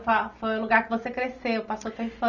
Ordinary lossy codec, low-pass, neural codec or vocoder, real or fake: none; 7.2 kHz; none; real